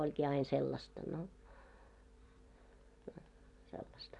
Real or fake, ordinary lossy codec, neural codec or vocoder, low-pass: fake; none; vocoder, 44.1 kHz, 128 mel bands every 256 samples, BigVGAN v2; 10.8 kHz